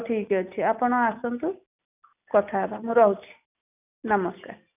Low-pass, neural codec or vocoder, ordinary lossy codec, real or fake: 3.6 kHz; none; none; real